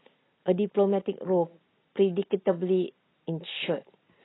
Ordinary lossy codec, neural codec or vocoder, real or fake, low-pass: AAC, 16 kbps; none; real; 7.2 kHz